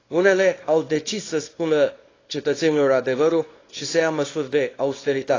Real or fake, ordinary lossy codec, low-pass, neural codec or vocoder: fake; AAC, 32 kbps; 7.2 kHz; codec, 24 kHz, 0.9 kbps, WavTokenizer, small release